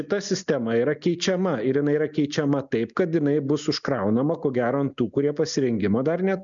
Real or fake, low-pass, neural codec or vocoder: real; 7.2 kHz; none